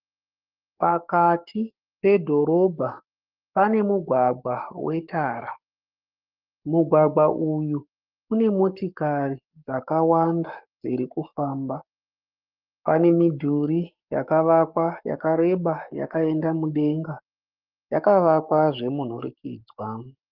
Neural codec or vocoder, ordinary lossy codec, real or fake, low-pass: codec, 44.1 kHz, 7.8 kbps, Pupu-Codec; Opus, 32 kbps; fake; 5.4 kHz